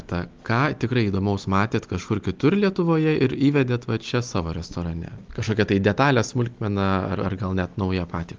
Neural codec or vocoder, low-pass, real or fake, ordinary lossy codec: none; 7.2 kHz; real; Opus, 32 kbps